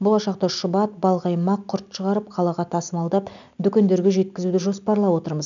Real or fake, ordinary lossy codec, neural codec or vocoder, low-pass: real; none; none; 7.2 kHz